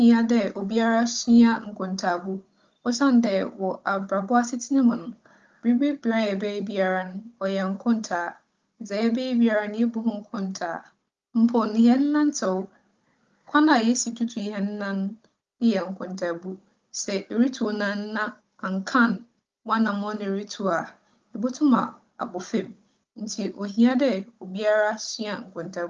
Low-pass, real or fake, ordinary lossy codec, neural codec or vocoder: 7.2 kHz; fake; Opus, 32 kbps; codec, 16 kHz, 16 kbps, FunCodec, trained on Chinese and English, 50 frames a second